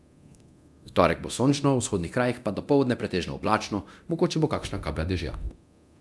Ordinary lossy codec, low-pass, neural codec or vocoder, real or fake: none; none; codec, 24 kHz, 0.9 kbps, DualCodec; fake